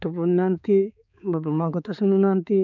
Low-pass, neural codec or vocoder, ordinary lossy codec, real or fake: 7.2 kHz; codec, 16 kHz, 4 kbps, X-Codec, HuBERT features, trained on balanced general audio; none; fake